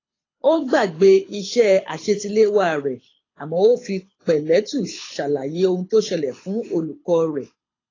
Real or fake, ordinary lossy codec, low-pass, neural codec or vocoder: fake; AAC, 32 kbps; 7.2 kHz; codec, 24 kHz, 6 kbps, HILCodec